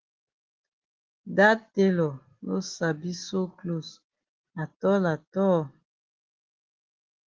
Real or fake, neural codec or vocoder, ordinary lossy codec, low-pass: real; none; Opus, 16 kbps; 7.2 kHz